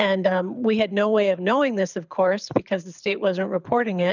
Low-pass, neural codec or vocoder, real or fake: 7.2 kHz; codec, 24 kHz, 6 kbps, HILCodec; fake